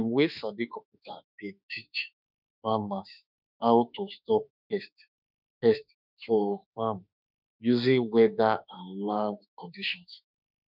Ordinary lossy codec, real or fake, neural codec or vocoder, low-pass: none; fake; autoencoder, 48 kHz, 32 numbers a frame, DAC-VAE, trained on Japanese speech; 5.4 kHz